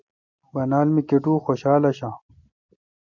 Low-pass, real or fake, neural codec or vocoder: 7.2 kHz; real; none